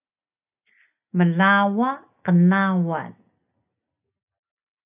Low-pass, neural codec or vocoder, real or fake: 3.6 kHz; none; real